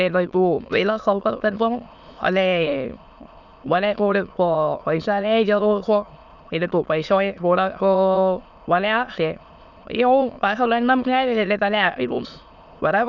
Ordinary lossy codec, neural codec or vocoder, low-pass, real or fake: none; autoencoder, 22.05 kHz, a latent of 192 numbers a frame, VITS, trained on many speakers; 7.2 kHz; fake